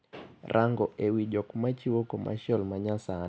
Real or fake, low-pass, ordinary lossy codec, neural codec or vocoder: real; none; none; none